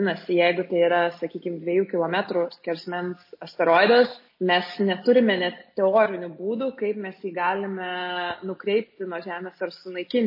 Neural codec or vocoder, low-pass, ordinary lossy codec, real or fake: none; 5.4 kHz; MP3, 24 kbps; real